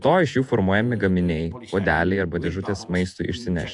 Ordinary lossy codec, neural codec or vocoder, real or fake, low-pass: AAC, 64 kbps; vocoder, 48 kHz, 128 mel bands, Vocos; fake; 10.8 kHz